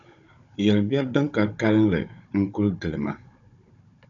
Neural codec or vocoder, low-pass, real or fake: codec, 16 kHz, 16 kbps, FunCodec, trained on Chinese and English, 50 frames a second; 7.2 kHz; fake